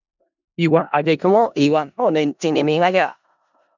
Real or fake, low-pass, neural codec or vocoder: fake; 7.2 kHz; codec, 16 kHz in and 24 kHz out, 0.4 kbps, LongCat-Audio-Codec, four codebook decoder